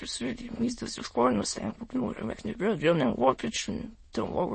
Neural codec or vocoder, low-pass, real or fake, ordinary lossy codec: autoencoder, 22.05 kHz, a latent of 192 numbers a frame, VITS, trained on many speakers; 9.9 kHz; fake; MP3, 32 kbps